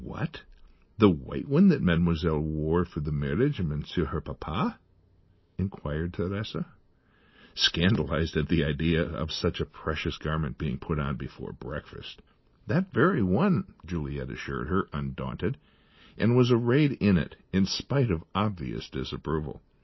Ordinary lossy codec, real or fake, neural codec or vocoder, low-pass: MP3, 24 kbps; real; none; 7.2 kHz